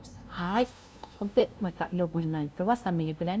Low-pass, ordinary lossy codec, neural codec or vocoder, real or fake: none; none; codec, 16 kHz, 0.5 kbps, FunCodec, trained on LibriTTS, 25 frames a second; fake